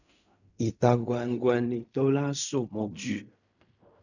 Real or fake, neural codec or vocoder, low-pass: fake; codec, 16 kHz in and 24 kHz out, 0.4 kbps, LongCat-Audio-Codec, fine tuned four codebook decoder; 7.2 kHz